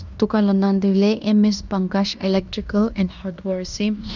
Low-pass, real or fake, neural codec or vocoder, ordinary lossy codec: 7.2 kHz; fake; codec, 16 kHz in and 24 kHz out, 0.9 kbps, LongCat-Audio-Codec, fine tuned four codebook decoder; none